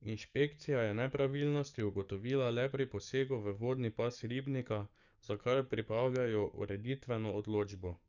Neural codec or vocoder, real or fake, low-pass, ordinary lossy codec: codec, 44.1 kHz, 7.8 kbps, DAC; fake; 7.2 kHz; none